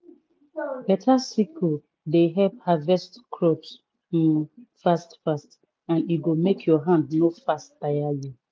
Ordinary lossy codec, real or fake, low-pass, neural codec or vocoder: none; real; none; none